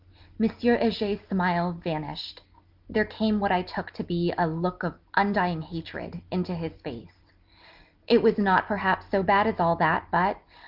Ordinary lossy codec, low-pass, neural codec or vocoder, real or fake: Opus, 24 kbps; 5.4 kHz; none; real